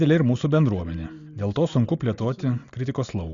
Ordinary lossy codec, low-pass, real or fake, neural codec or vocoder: Opus, 64 kbps; 7.2 kHz; real; none